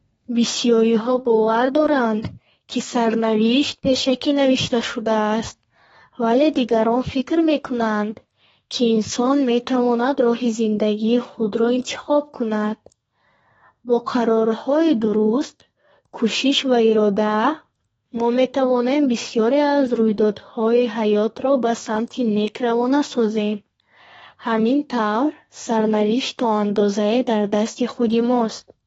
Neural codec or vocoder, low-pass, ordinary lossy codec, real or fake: codec, 32 kHz, 1.9 kbps, SNAC; 14.4 kHz; AAC, 24 kbps; fake